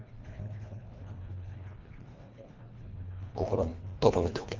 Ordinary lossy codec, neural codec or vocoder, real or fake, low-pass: Opus, 32 kbps; codec, 24 kHz, 1.5 kbps, HILCodec; fake; 7.2 kHz